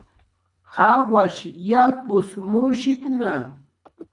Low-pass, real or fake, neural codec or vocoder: 10.8 kHz; fake; codec, 24 kHz, 1.5 kbps, HILCodec